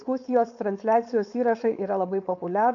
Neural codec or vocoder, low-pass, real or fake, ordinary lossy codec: codec, 16 kHz, 4.8 kbps, FACodec; 7.2 kHz; fake; AAC, 64 kbps